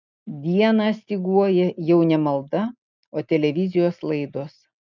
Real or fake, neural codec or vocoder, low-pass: real; none; 7.2 kHz